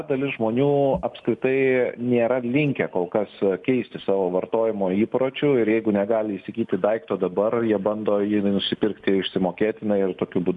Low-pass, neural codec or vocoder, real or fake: 10.8 kHz; none; real